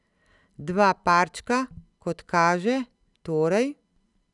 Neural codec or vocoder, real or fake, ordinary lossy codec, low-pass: none; real; none; 10.8 kHz